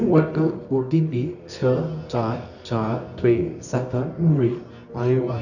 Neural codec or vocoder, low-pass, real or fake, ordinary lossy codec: codec, 24 kHz, 0.9 kbps, WavTokenizer, medium music audio release; 7.2 kHz; fake; none